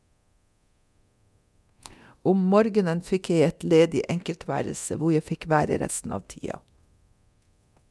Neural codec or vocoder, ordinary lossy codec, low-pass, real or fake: codec, 24 kHz, 0.9 kbps, DualCodec; none; none; fake